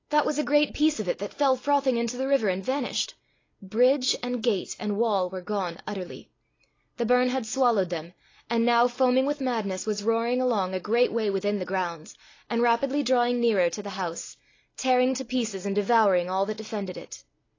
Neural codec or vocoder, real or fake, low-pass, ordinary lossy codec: none; real; 7.2 kHz; AAC, 32 kbps